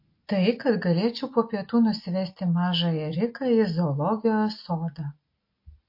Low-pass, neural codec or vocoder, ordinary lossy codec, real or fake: 5.4 kHz; none; MP3, 32 kbps; real